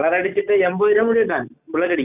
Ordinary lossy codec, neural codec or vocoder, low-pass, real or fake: none; none; 3.6 kHz; real